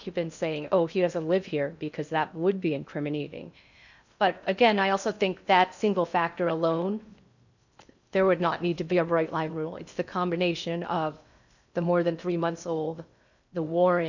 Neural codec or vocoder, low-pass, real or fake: codec, 16 kHz in and 24 kHz out, 0.6 kbps, FocalCodec, streaming, 2048 codes; 7.2 kHz; fake